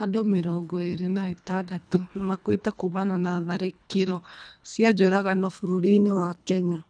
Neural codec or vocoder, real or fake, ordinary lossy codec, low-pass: codec, 24 kHz, 1.5 kbps, HILCodec; fake; none; 9.9 kHz